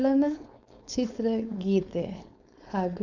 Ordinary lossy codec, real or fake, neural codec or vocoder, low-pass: none; fake; codec, 16 kHz, 4.8 kbps, FACodec; 7.2 kHz